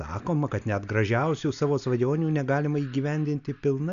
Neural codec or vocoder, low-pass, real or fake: none; 7.2 kHz; real